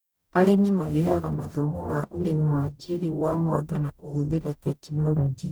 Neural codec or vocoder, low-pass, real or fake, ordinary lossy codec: codec, 44.1 kHz, 0.9 kbps, DAC; none; fake; none